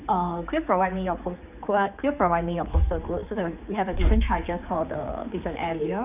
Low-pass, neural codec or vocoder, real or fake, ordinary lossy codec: 3.6 kHz; codec, 16 kHz, 4 kbps, X-Codec, HuBERT features, trained on general audio; fake; none